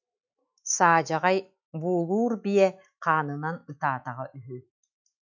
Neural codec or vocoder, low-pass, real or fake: autoencoder, 48 kHz, 128 numbers a frame, DAC-VAE, trained on Japanese speech; 7.2 kHz; fake